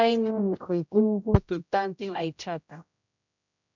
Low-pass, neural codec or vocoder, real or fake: 7.2 kHz; codec, 16 kHz, 0.5 kbps, X-Codec, HuBERT features, trained on general audio; fake